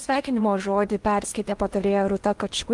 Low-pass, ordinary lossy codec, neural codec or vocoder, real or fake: 10.8 kHz; Opus, 24 kbps; codec, 16 kHz in and 24 kHz out, 0.8 kbps, FocalCodec, streaming, 65536 codes; fake